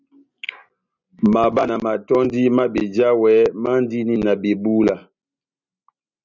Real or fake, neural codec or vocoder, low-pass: real; none; 7.2 kHz